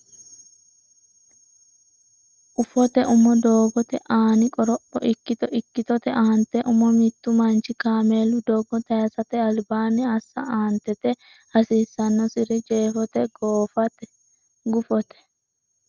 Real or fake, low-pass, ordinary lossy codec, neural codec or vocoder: real; 7.2 kHz; Opus, 24 kbps; none